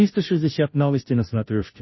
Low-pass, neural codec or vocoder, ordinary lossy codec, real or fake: 7.2 kHz; codec, 16 kHz, 0.5 kbps, FunCodec, trained on Chinese and English, 25 frames a second; MP3, 24 kbps; fake